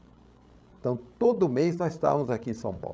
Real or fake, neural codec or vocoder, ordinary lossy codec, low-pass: fake; codec, 16 kHz, 16 kbps, FreqCodec, larger model; none; none